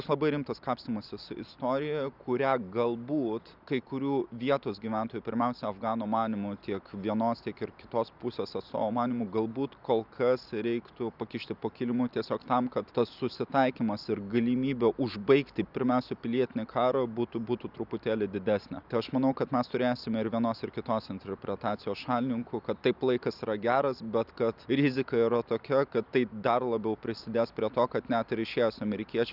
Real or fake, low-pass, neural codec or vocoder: real; 5.4 kHz; none